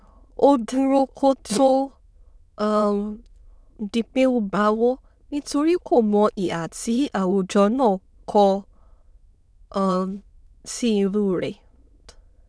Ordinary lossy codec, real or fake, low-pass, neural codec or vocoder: none; fake; none; autoencoder, 22.05 kHz, a latent of 192 numbers a frame, VITS, trained on many speakers